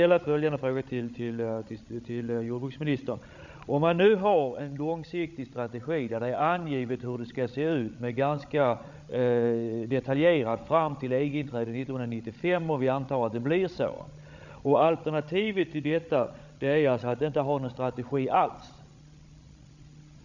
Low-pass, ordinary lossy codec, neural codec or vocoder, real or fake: 7.2 kHz; none; codec, 16 kHz, 16 kbps, FreqCodec, larger model; fake